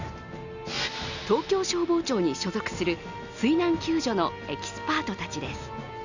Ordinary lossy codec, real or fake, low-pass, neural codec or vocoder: none; real; 7.2 kHz; none